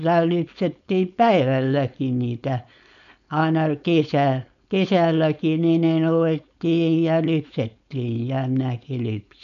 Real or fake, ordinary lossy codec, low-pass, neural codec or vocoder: fake; none; 7.2 kHz; codec, 16 kHz, 4.8 kbps, FACodec